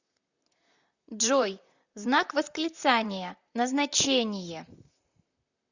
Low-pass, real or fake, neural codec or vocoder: 7.2 kHz; fake; vocoder, 44.1 kHz, 128 mel bands, Pupu-Vocoder